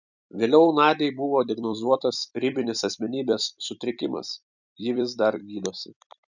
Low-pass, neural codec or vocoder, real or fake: 7.2 kHz; vocoder, 44.1 kHz, 128 mel bands every 256 samples, BigVGAN v2; fake